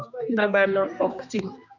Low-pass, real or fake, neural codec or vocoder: 7.2 kHz; fake; codec, 16 kHz, 2 kbps, X-Codec, HuBERT features, trained on general audio